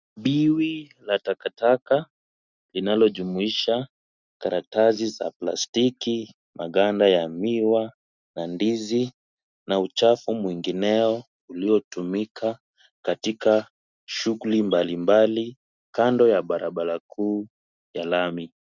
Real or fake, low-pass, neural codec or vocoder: real; 7.2 kHz; none